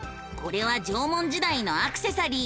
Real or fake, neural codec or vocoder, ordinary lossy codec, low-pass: real; none; none; none